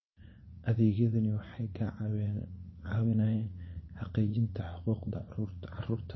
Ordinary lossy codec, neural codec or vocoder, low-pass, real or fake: MP3, 24 kbps; vocoder, 44.1 kHz, 80 mel bands, Vocos; 7.2 kHz; fake